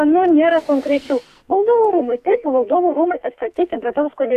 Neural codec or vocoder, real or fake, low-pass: codec, 44.1 kHz, 2.6 kbps, SNAC; fake; 14.4 kHz